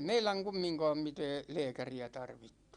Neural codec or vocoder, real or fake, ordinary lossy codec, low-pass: none; real; Opus, 64 kbps; 9.9 kHz